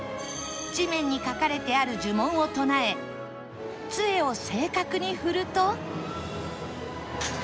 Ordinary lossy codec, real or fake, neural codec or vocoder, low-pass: none; real; none; none